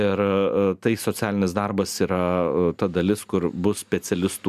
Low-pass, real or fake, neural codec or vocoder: 14.4 kHz; real; none